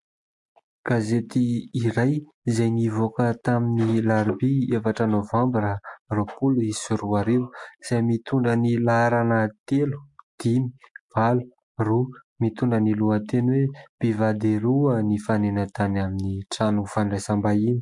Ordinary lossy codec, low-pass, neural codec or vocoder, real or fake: MP3, 64 kbps; 10.8 kHz; none; real